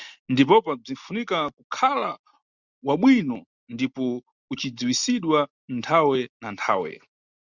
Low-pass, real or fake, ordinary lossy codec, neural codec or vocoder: 7.2 kHz; real; Opus, 64 kbps; none